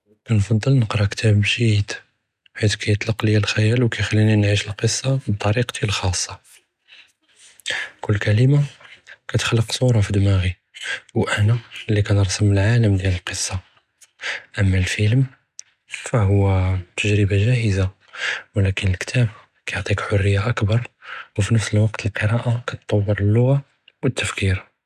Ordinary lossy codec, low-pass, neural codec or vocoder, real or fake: none; 14.4 kHz; none; real